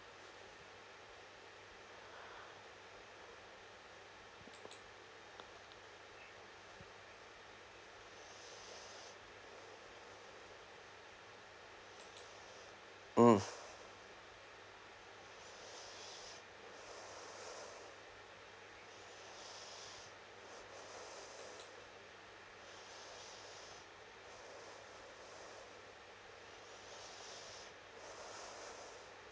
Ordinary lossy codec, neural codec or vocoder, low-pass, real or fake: none; none; none; real